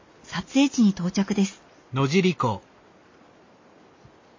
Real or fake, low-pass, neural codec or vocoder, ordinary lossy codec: real; 7.2 kHz; none; MP3, 32 kbps